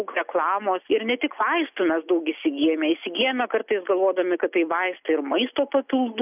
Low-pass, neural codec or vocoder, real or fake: 3.6 kHz; none; real